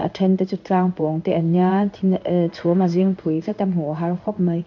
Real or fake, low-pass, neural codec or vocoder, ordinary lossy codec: fake; 7.2 kHz; codec, 16 kHz in and 24 kHz out, 1 kbps, XY-Tokenizer; none